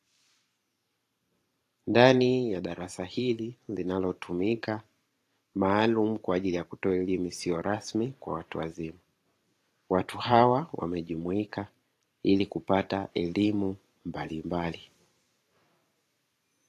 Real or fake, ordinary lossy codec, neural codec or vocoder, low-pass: fake; AAC, 48 kbps; vocoder, 44.1 kHz, 128 mel bands every 512 samples, BigVGAN v2; 14.4 kHz